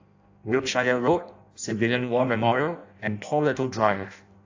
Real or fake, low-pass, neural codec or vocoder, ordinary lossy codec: fake; 7.2 kHz; codec, 16 kHz in and 24 kHz out, 0.6 kbps, FireRedTTS-2 codec; AAC, 48 kbps